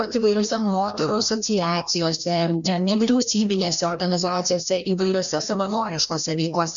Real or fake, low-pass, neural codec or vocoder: fake; 7.2 kHz; codec, 16 kHz, 1 kbps, FreqCodec, larger model